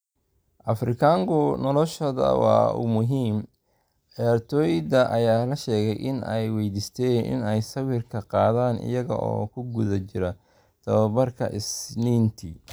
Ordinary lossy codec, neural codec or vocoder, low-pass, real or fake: none; none; none; real